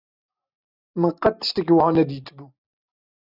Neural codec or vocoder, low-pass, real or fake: none; 5.4 kHz; real